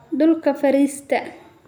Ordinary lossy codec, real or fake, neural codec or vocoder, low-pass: none; real; none; none